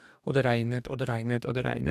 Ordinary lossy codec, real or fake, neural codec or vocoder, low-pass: none; fake; codec, 44.1 kHz, 2.6 kbps, DAC; 14.4 kHz